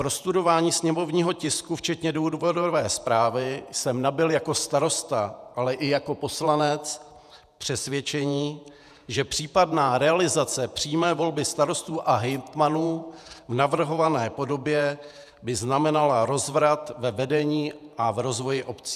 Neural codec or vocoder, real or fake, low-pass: vocoder, 48 kHz, 128 mel bands, Vocos; fake; 14.4 kHz